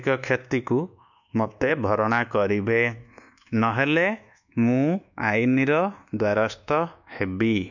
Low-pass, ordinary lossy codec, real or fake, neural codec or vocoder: 7.2 kHz; none; fake; codec, 24 kHz, 1.2 kbps, DualCodec